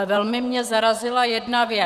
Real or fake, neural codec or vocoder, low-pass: fake; vocoder, 44.1 kHz, 128 mel bands, Pupu-Vocoder; 14.4 kHz